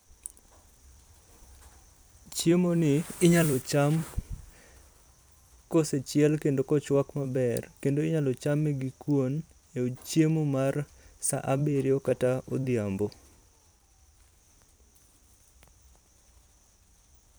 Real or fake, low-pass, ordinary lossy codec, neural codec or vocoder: fake; none; none; vocoder, 44.1 kHz, 128 mel bands every 256 samples, BigVGAN v2